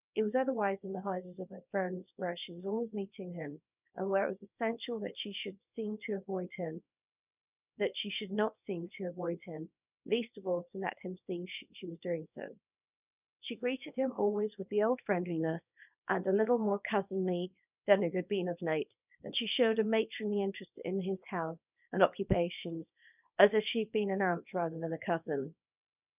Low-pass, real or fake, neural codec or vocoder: 3.6 kHz; fake; codec, 24 kHz, 0.9 kbps, WavTokenizer, small release